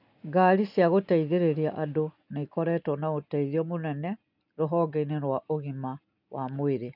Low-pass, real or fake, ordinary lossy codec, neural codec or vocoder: 5.4 kHz; real; none; none